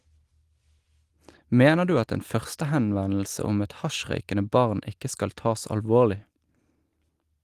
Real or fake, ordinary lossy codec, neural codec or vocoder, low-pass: real; Opus, 24 kbps; none; 14.4 kHz